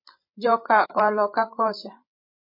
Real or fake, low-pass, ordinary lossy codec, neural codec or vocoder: fake; 5.4 kHz; MP3, 24 kbps; codec, 16 kHz, 8 kbps, FreqCodec, larger model